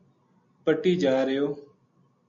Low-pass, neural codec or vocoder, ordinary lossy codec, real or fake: 7.2 kHz; none; MP3, 48 kbps; real